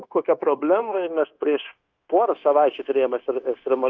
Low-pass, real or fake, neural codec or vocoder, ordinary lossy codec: 7.2 kHz; fake; codec, 16 kHz, 0.9 kbps, LongCat-Audio-Codec; Opus, 32 kbps